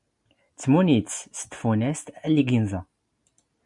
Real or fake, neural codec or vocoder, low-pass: real; none; 10.8 kHz